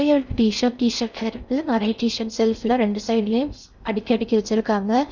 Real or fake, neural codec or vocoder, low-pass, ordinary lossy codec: fake; codec, 16 kHz in and 24 kHz out, 0.6 kbps, FocalCodec, streaming, 4096 codes; 7.2 kHz; none